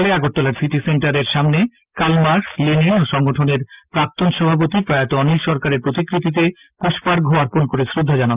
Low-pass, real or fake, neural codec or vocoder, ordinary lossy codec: 3.6 kHz; real; none; Opus, 24 kbps